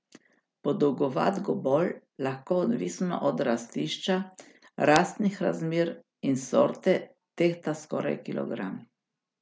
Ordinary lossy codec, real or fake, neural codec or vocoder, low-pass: none; real; none; none